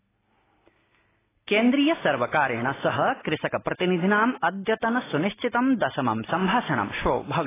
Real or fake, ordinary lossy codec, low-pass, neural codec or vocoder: real; AAC, 16 kbps; 3.6 kHz; none